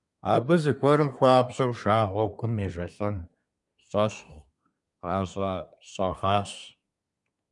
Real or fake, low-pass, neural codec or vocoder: fake; 10.8 kHz; codec, 24 kHz, 1 kbps, SNAC